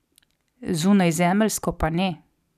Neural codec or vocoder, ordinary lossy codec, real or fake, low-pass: none; none; real; 14.4 kHz